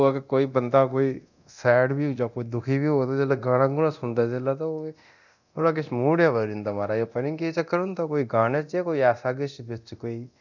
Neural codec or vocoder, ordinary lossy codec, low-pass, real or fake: codec, 24 kHz, 0.9 kbps, DualCodec; none; 7.2 kHz; fake